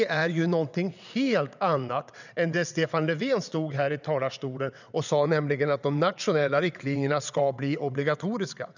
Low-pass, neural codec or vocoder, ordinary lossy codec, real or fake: 7.2 kHz; vocoder, 22.05 kHz, 80 mel bands, WaveNeXt; none; fake